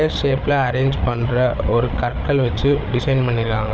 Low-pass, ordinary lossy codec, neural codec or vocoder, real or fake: none; none; codec, 16 kHz, 8 kbps, FreqCodec, larger model; fake